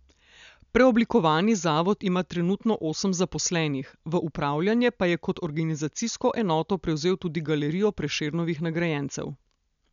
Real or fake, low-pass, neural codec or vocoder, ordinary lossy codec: real; 7.2 kHz; none; none